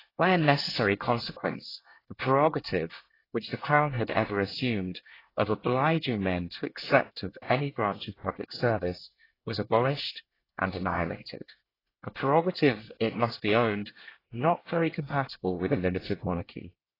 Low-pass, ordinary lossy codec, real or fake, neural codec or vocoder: 5.4 kHz; AAC, 24 kbps; fake; codec, 24 kHz, 1 kbps, SNAC